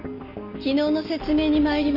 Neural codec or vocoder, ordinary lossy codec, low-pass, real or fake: none; none; 5.4 kHz; real